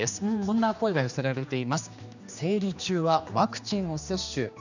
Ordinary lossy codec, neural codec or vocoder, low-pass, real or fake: none; codec, 16 kHz, 2 kbps, X-Codec, HuBERT features, trained on general audio; 7.2 kHz; fake